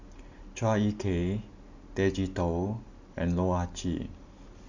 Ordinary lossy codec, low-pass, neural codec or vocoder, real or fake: Opus, 64 kbps; 7.2 kHz; none; real